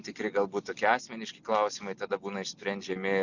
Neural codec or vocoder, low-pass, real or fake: none; 7.2 kHz; real